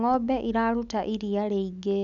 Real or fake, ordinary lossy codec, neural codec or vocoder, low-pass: real; none; none; 7.2 kHz